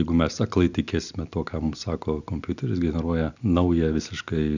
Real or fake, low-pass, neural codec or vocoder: real; 7.2 kHz; none